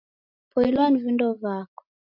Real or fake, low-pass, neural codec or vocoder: real; 5.4 kHz; none